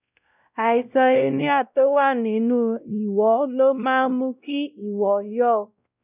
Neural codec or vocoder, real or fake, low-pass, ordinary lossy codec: codec, 16 kHz, 0.5 kbps, X-Codec, WavLM features, trained on Multilingual LibriSpeech; fake; 3.6 kHz; none